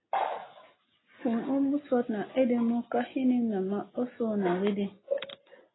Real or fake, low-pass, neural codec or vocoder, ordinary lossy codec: real; 7.2 kHz; none; AAC, 16 kbps